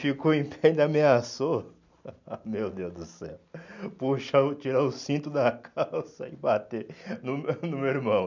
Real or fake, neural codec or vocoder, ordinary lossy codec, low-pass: real; none; none; 7.2 kHz